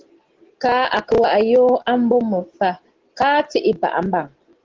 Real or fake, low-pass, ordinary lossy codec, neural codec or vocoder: real; 7.2 kHz; Opus, 16 kbps; none